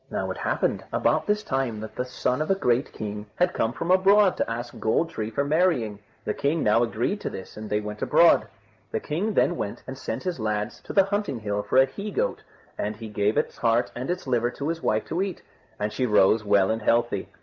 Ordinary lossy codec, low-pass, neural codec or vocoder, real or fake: Opus, 32 kbps; 7.2 kHz; none; real